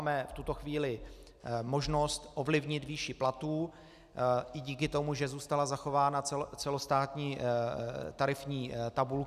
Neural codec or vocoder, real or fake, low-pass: none; real; 14.4 kHz